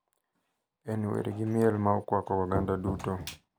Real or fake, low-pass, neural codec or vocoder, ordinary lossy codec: real; none; none; none